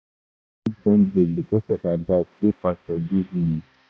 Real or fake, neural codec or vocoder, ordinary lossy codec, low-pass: fake; codec, 16 kHz, 1 kbps, X-Codec, HuBERT features, trained on balanced general audio; none; none